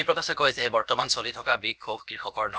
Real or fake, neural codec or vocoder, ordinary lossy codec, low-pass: fake; codec, 16 kHz, about 1 kbps, DyCAST, with the encoder's durations; none; none